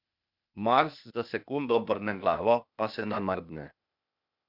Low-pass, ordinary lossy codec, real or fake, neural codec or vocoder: 5.4 kHz; none; fake; codec, 16 kHz, 0.8 kbps, ZipCodec